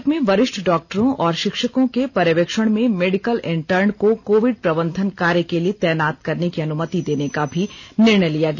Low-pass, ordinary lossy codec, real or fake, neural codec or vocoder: 7.2 kHz; none; real; none